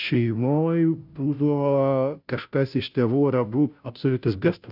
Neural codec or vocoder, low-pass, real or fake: codec, 16 kHz, 0.5 kbps, FunCodec, trained on Chinese and English, 25 frames a second; 5.4 kHz; fake